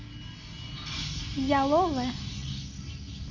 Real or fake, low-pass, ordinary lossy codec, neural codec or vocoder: real; 7.2 kHz; Opus, 32 kbps; none